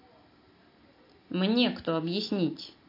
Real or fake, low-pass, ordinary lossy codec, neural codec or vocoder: real; 5.4 kHz; AAC, 32 kbps; none